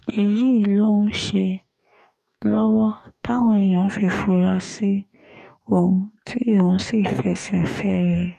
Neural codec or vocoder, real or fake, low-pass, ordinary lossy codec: codec, 44.1 kHz, 2.6 kbps, DAC; fake; 14.4 kHz; none